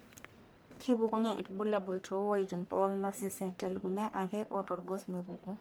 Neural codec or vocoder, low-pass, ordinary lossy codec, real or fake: codec, 44.1 kHz, 1.7 kbps, Pupu-Codec; none; none; fake